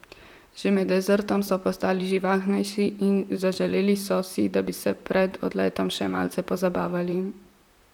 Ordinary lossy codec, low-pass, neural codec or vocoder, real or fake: none; 19.8 kHz; vocoder, 44.1 kHz, 128 mel bands, Pupu-Vocoder; fake